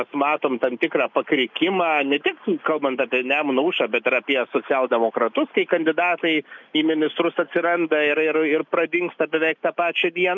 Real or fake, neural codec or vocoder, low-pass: real; none; 7.2 kHz